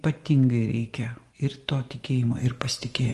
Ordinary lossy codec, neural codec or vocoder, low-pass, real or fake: Opus, 24 kbps; vocoder, 24 kHz, 100 mel bands, Vocos; 10.8 kHz; fake